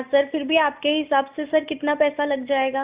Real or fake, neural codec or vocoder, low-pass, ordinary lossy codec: real; none; 3.6 kHz; Opus, 64 kbps